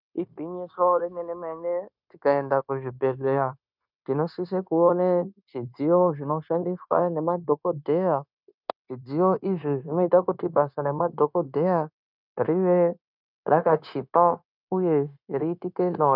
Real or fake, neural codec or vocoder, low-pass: fake; codec, 16 kHz, 0.9 kbps, LongCat-Audio-Codec; 5.4 kHz